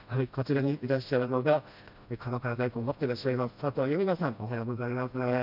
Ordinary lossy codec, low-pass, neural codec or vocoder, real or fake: none; 5.4 kHz; codec, 16 kHz, 1 kbps, FreqCodec, smaller model; fake